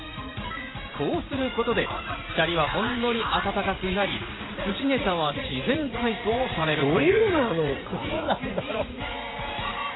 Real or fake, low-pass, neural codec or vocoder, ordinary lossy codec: fake; 7.2 kHz; vocoder, 44.1 kHz, 80 mel bands, Vocos; AAC, 16 kbps